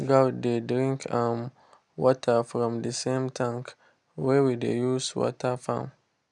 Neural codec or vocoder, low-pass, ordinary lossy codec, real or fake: none; 10.8 kHz; none; real